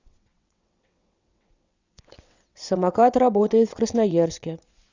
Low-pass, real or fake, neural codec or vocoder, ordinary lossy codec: 7.2 kHz; fake; vocoder, 22.05 kHz, 80 mel bands, WaveNeXt; Opus, 64 kbps